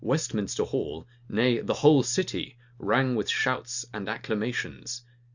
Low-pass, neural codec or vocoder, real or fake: 7.2 kHz; none; real